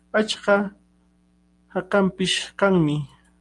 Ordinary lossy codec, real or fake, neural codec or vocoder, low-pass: Opus, 32 kbps; real; none; 10.8 kHz